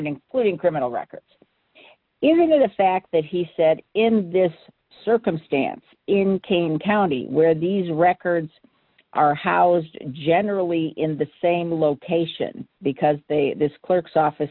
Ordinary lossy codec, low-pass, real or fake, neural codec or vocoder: MP3, 48 kbps; 5.4 kHz; real; none